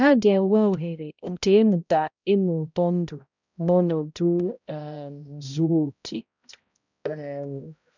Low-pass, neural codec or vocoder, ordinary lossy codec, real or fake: 7.2 kHz; codec, 16 kHz, 0.5 kbps, X-Codec, HuBERT features, trained on balanced general audio; none; fake